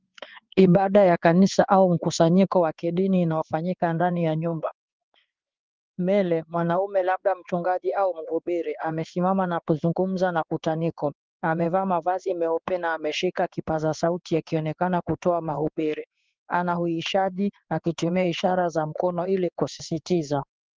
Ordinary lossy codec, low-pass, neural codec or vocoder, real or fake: Opus, 32 kbps; 7.2 kHz; codec, 16 kHz in and 24 kHz out, 1 kbps, XY-Tokenizer; fake